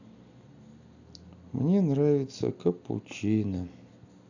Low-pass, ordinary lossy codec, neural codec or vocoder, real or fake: 7.2 kHz; none; none; real